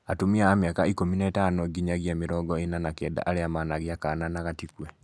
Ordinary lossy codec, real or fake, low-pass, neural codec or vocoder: none; real; none; none